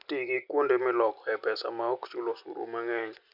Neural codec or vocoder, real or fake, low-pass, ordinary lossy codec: none; real; 5.4 kHz; none